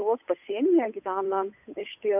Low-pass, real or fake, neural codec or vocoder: 3.6 kHz; fake; codec, 16 kHz, 8 kbps, FunCodec, trained on Chinese and English, 25 frames a second